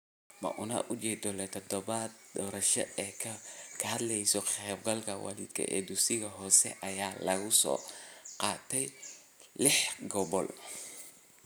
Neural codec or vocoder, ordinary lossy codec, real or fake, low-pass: none; none; real; none